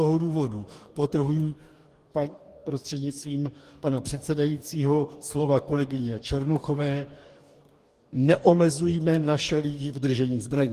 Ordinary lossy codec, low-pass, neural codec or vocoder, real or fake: Opus, 24 kbps; 14.4 kHz; codec, 44.1 kHz, 2.6 kbps, DAC; fake